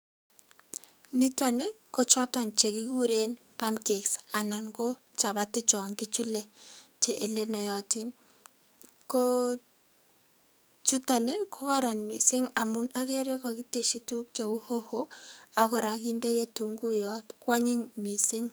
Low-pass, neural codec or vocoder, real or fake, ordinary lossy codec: none; codec, 44.1 kHz, 2.6 kbps, SNAC; fake; none